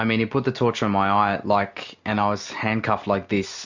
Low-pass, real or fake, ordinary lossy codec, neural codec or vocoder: 7.2 kHz; real; MP3, 64 kbps; none